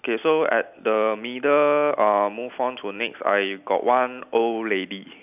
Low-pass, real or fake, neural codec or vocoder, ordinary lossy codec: 3.6 kHz; real; none; none